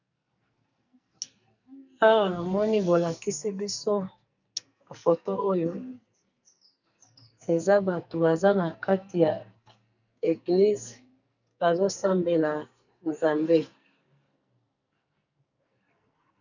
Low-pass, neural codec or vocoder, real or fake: 7.2 kHz; codec, 44.1 kHz, 2.6 kbps, SNAC; fake